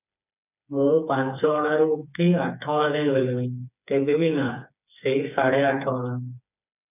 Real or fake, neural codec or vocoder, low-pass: fake; codec, 16 kHz, 4 kbps, FreqCodec, smaller model; 3.6 kHz